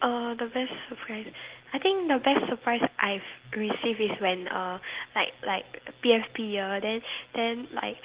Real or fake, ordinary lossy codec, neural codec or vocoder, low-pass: real; Opus, 16 kbps; none; 3.6 kHz